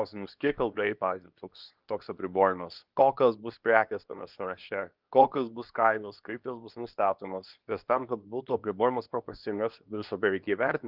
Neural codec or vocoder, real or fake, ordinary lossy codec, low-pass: codec, 24 kHz, 0.9 kbps, WavTokenizer, medium speech release version 2; fake; Opus, 24 kbps; 5.4 kHz